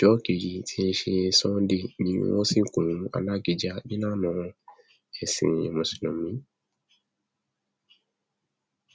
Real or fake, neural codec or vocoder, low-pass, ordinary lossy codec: real; none; none; none